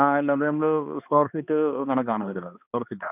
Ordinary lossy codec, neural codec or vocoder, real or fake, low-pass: none; codec, 16 kHz, 2 kbps, X-Codec, HuBERT features, trained on balanced general audio; fake; 3.6 kHz